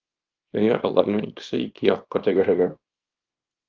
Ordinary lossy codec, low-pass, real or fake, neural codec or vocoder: Opus, 32 kbps; 7.2 kHz; fake; codec, 24 kHz, 0.9 kbps, WavTokenizer, small release